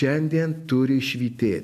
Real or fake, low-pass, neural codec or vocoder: real; 14.4 kHz; none